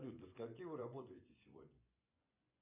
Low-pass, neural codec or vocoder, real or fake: 3.6 kHz; vocoder, 24 kHz, 100 mel bands, Vocos; fake